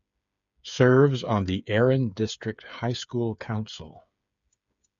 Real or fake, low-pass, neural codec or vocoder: fake; 7.2 kHz; codec, 16 kHz, 8 kbps, FreqCodec, smaller model